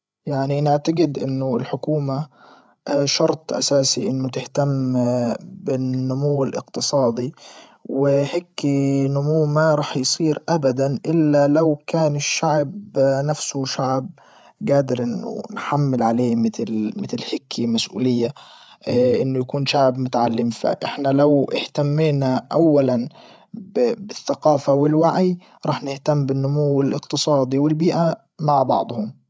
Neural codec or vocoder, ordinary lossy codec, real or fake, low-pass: codec, 16 kHz, 8 kbps, FreqCodec, larger model; none; fake; none